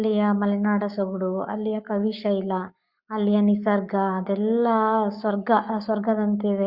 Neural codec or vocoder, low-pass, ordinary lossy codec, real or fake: codec, 44.1 kHz, 7.8 kbps, Pupu-Codec; 5.4 kHz; Opus, 64 kbps; fake